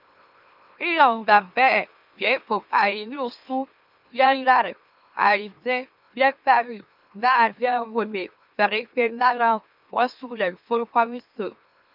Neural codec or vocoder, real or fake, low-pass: autoencoder, 44.1 kHz, a latent of 192 numbers a frame, MeloTTS; fake; 5.4 kHz